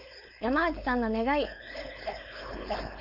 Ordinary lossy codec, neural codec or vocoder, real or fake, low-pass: none; codec, 16 kHz, 4.8 kbps, FACodec; fake; 5.4 kHz